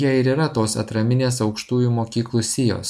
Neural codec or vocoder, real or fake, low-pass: none; real; 14.4 kHz